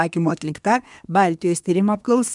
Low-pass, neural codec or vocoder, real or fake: 10.8 kHz; codec, 24 kHz, 1 kbps, SNAC; fake